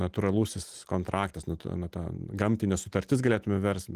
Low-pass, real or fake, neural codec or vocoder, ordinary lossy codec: 14.4 kHz; real; none; Opus, 24 kbps